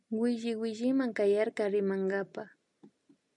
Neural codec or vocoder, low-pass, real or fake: none; 10.8 kHz; real